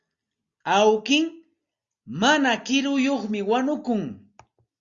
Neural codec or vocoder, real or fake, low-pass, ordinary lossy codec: none; real; 7.2 kHz; Opus, 64 kbps